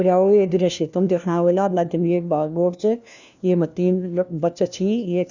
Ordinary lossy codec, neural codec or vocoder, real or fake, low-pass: none; codec, 16 kHz, 1 kbps, FunCodec, trained on LibriTTS, 50 frames a second; fake; 7.2 kHz